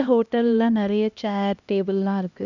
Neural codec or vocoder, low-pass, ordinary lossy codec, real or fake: codec, 16 kHz, 1 kbps, X-Codec, HuBERT features, trained on LibriSpeech; 7.2 kHz; none; fake